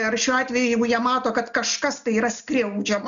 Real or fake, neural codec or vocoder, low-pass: real; none; 7.2 kHz